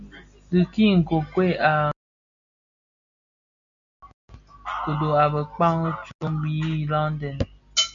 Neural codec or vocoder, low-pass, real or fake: none; 7.2 kHz; real